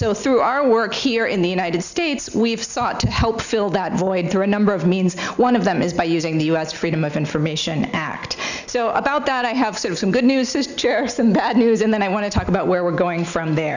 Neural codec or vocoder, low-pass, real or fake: none; 7.2 kHz; real